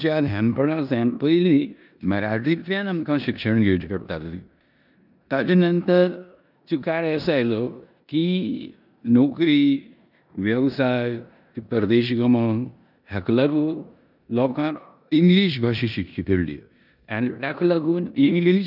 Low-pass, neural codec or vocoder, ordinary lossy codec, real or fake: 5.4 kHz; codec, 16 kHz in and 24 kHz out, 0.9 kbps, LongCat-Audio-Codec, four codebook decoder; none; fake